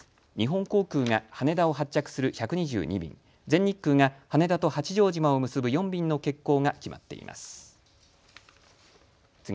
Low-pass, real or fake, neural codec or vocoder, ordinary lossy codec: none; real; none; none